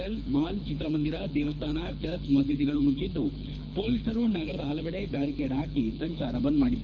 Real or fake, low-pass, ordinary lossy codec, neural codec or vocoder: fake; 7.2 kHz; none; codec, 24 kHz, 3 kbps, HILCodec